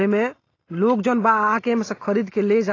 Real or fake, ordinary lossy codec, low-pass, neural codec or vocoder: real; AAC, 32 kbps; 7.2 kHz; none